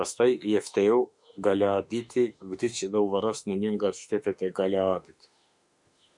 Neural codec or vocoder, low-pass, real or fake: autoencoder, 48 kHz, 32 numbers a frame, DAC-VAE, trained on Japanese speech; 10.8 kHz; fake